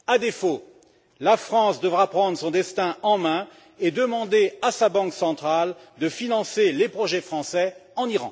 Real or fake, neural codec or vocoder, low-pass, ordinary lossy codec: real; none; none; none